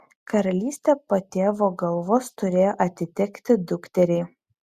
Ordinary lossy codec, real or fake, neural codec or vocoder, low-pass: Opus, 64 kbps; real; none; 14.4 kHz